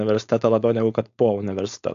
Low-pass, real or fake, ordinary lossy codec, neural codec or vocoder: 7.2 kHz; fake; AAC, 64 kbps; codec, 16 kHz, 4.8 kbps, FACodec